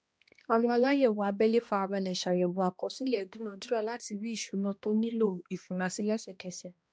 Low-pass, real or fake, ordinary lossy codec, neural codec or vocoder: none; fake; none; codec, 16 kHz, 1 kbps, X-Codec, HuBERT features, trained on balanced general audio